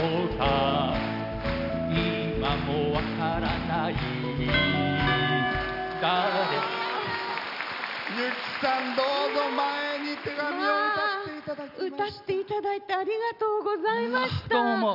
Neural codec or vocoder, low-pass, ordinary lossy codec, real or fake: none; 5.4 kHz; none; real